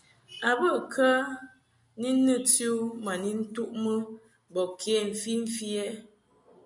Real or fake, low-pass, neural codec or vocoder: real; 10.8 kHz; none